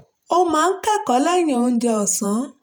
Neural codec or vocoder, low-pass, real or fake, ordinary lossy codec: vocoder, 48 kHz, 128 mel bands, Vocos; none; fake; none